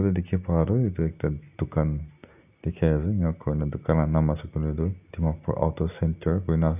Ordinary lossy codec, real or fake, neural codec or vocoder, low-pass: none; real; none; 3.6 kHz